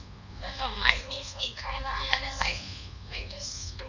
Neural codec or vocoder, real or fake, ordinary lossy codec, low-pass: codec, 24 kHz, 1.2 kbps, DualCodec; fake; none; 7.2 kHz